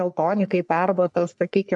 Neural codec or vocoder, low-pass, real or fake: codec, 44.1 kHz, 3.4 kbps, Pupu-Codec; 10.8 kHz; fake